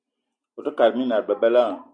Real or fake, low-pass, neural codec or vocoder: real; 9.9 kHz; none